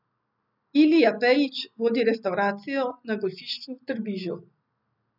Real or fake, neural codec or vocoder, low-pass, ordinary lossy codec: real; none; 5.4 kHz; none